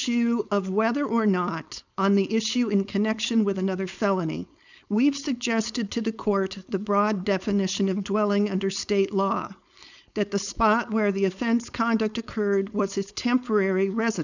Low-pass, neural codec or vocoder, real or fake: 7.2 kHz; codec, 16 kHz, 4.8 kbps, FACodec; fake